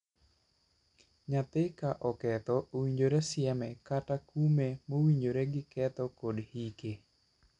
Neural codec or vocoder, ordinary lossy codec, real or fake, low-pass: none; none; real; 10.8 kHz